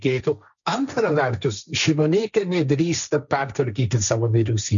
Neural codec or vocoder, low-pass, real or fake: codec, 16 kHz, 1.1 kbps, Voila-Tokenizer; 7.2 kHz; fake